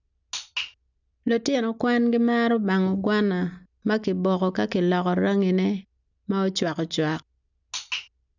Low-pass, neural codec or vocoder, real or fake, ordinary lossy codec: 7.2 kHz; none; real; none